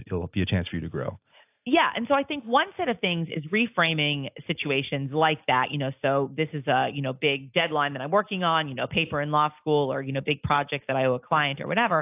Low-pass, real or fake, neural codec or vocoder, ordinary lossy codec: 3.6 kHz; real; none; AAC, 32 kbps